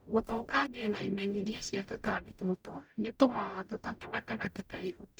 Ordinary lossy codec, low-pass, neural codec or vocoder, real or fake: none; none; codec, 44.1 kHz, 0.9 kbps, DAC; fake